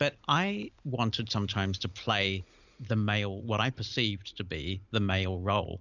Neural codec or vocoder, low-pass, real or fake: none; 7.2 kHz; real